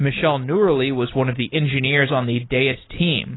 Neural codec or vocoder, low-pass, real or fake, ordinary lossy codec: none; 7.2 kHz; real; AAC, 16 kbps